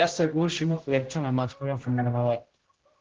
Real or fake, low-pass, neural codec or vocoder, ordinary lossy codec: fake; 7.2 kHz; codec, 16 kHz, 0.5 kbps, X-Codec, HuBERT features, trained on general audio; Opus, 16 kbps